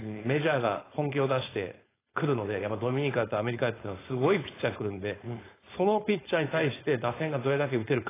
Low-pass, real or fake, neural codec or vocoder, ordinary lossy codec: 3.6 kHz; fake; codec, 16 kHz, 4.8 kbps, FACodec; AAC, 16 kbps